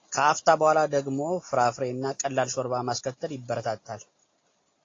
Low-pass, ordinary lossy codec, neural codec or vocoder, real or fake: 7.2 kHz; AAC, 32 kbps; none; real